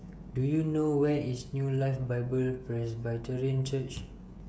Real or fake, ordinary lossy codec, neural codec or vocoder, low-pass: fake; none; codec, 16 kHz, 16 kbps, FreqCodec, smaller model; none